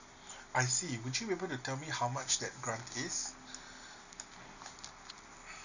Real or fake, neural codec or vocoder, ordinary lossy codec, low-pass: real; none; none; 7.2 kHz